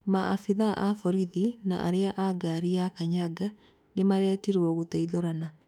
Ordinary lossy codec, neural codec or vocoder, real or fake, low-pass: none; autoencoder, 48 kHz, 32 numbers a frame, DAC-VAE, trained on Japanese speech; fake; 19.8 kHz